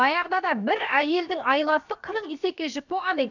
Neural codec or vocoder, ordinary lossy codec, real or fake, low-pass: codec, 16 kHz, about 1 kbps, DyCAST, with the encoder's durations; none; fake; 7.2 kHz